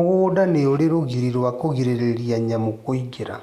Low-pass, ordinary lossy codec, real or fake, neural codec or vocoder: 14.4 kHz; Opus, 64 kbps; real; none